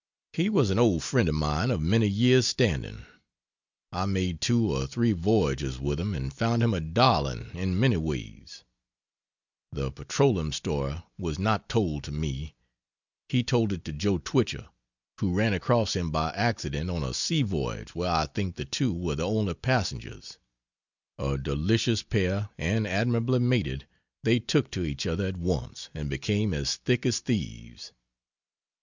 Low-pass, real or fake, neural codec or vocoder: 7.2 kHz; real; none